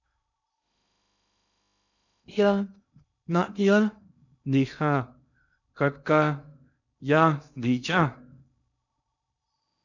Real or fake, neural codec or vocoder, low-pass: fake; codec, 16 kHz in and 24 kHz out, 0.6 kbps, FocalCodec, streaming, 2048 codes; 7.2 kHz